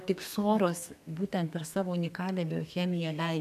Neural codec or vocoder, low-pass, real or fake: codec, 32 kHz, 1.9 kbps, SNAC; 14.4 kHz; fake